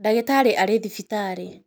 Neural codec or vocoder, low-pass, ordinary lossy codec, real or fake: none; none; none; real